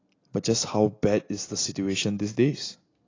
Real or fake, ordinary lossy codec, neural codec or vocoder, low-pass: real; AAC, 32 kbps; none; 7.2 kHz